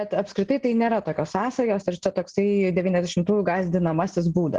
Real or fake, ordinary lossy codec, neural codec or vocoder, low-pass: real; Opus, 16 kbps; none; 10.8 kHz